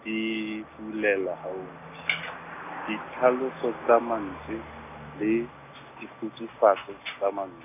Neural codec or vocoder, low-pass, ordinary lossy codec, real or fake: none; 3.6 kHz; none; real